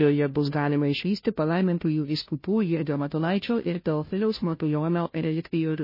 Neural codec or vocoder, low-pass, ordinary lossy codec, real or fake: codec, 16 kHz, 0.5 kbps, FunCodec, trained on Chinese and English, 25 frames a second; 5.4 kHz; MP3, 24 kbps; fake